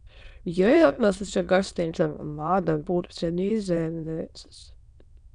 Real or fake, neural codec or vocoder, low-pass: fake; autoencoder, 22.05 kHz, a latent of 192 numbers a frame, VITS, trained on many speakers; 9.9 kHz